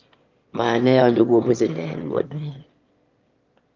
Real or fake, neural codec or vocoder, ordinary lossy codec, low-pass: fake; autoencoder, 22.05 kHz, a latent of 192 numbers a frame, VITS, trained on one speaker; Opus, 24 kbps; 7.2 kHz